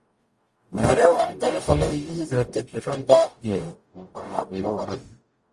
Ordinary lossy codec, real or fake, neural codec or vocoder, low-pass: Opus, 32 kbps; fake; codec, 44.1 kHz, 0.9 kbps, DAC; 10.8 kHz